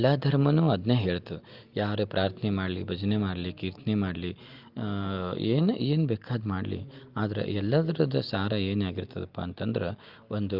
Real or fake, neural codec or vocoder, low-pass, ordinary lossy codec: real; none; 5.4 kHz; Opus, 24 kbps